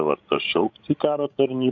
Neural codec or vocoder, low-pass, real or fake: codec, 16 kHz, 16 kbps, FreqCodec, larger model; 7.2 kHz; fake